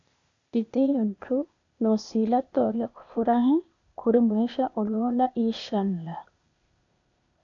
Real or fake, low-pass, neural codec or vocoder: fake; 7.2 kHz; codec, 16 kHz, 0.8 kbps, ZipCodec